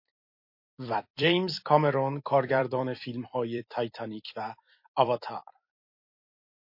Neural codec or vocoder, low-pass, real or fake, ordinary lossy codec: vocoder, 44.1 kHz, 128 mel bands every 256 samples, BigVGAN v2; 5.4 kHz; fake; MP3, 32 kbps